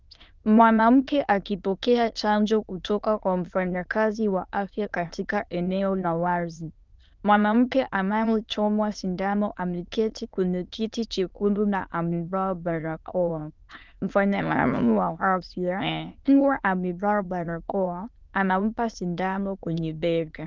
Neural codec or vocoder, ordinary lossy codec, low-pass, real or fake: autoencoder, 22.05 kHz, a latent of 192 numbers a frame, VITS, trained on many speakers; Opus, 32 kbps; 7.2 kHz; fake